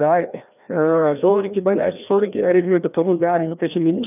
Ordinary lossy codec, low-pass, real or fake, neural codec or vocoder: none; 3.6 kHz; fake; codec, 16 kHz, 1 kbps, FreqCodec, larger model